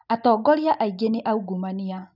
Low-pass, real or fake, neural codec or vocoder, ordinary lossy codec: 5.4 kHz; real; none; none